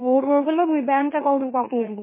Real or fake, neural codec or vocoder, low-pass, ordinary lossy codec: fake; autoencoder, 44.1 kHz, a latent of 192 numbers a frame, MeloTTS; 3.6 kHz; MP3, 16 kbps